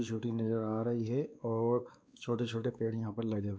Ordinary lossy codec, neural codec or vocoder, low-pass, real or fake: none; codec, 16 kHz, 2 kbps, X-Codec, WavLM features, trained on Multilingual LibriSpeech; none; fake